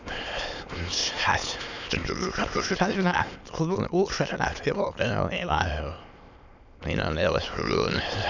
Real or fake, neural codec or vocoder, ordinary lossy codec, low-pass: fake; autoencoder, 22.05 kHz, a latent of 192 numbers a frame, VITS, trained on many speakers; none; 7.2 kHz